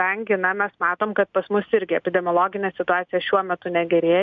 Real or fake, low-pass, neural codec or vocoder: real; 7.2 kHz; none